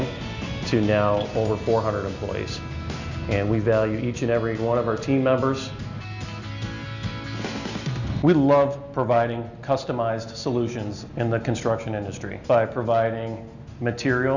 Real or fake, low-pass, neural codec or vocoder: real; 7.2 kHz; none